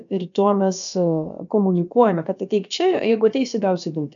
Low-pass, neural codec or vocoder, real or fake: 7.2 kHz; codec, 16 kHz, about 1 kbps, DyCAST, with the encoder's durations; fake